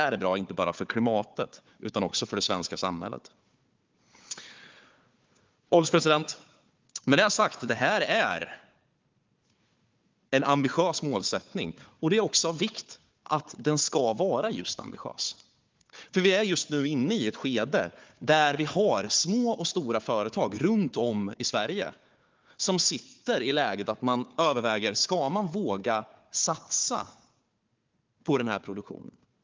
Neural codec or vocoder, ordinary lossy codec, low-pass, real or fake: codec, 16 kHz, 4 kbps, FunCodec, trained on Chinese and English, 50 frames a second; Opus, 32 kbps; 7.2 kHz; fake